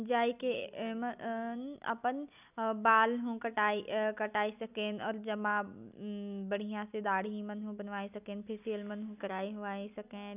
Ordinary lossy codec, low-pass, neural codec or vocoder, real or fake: none; 3.6 kHz; none; real